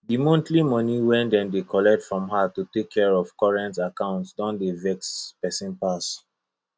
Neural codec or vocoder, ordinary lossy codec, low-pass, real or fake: none; none; none; real